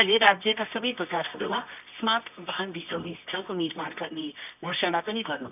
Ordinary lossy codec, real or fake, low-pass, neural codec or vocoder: none; fake; 3.6 kHz; codec, 24 kHz, 0.9 kbps, WavTokenizer, medium music audio release